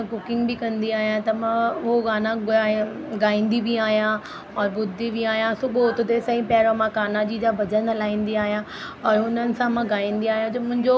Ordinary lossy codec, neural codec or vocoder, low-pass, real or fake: none; none; none; real